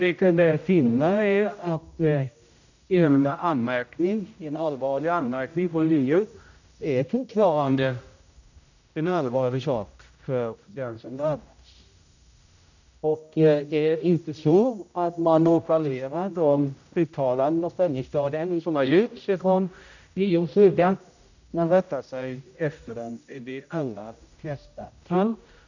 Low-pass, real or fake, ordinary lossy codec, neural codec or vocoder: 7.2 kHz; fake; none; codec, 16 kHz, 0.5 kbps, X-Codec, HuBERT features, trained on general audio